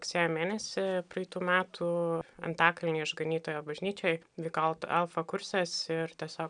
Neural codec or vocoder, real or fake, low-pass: none; real; 9.9 kHz